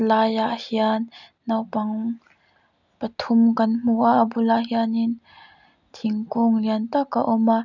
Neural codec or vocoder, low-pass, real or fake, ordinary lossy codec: none; 7.2 kHz; real; none